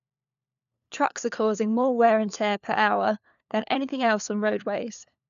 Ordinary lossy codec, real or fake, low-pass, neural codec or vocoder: none; fake; 7.2 kHz; codec, 16 kHz, 4 kbps, FunCodec, trained on LibriTTS, 50 frames a second